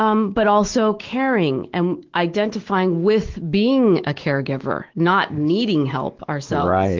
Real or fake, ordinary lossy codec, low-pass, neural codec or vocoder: fake; Opus, 16 kbps; 7.2 kHz; autoencoder, 48 kHz, 128 numbers a frame, DAC-VAE, trained on Japanese speech